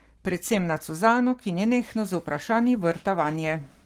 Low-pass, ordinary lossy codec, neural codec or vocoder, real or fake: 19.8 kHz; Opus, 24 kbps; codec, 44.1 kHz, 7.8 kbps, Pupu-Codec; fake